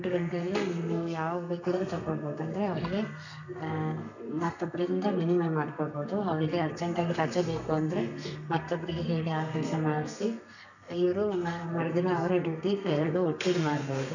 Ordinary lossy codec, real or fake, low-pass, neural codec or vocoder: none; fake; 7.2 kHz; codec, 32 kHz, 1.9 kbps, SNAC